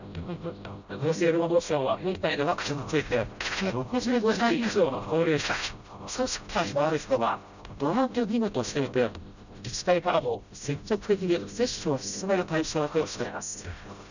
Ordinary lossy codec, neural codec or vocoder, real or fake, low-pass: none; codec, 16 kHz, 0.5 kbps, FreqCodec, smaller model; fake; 7.2 kHz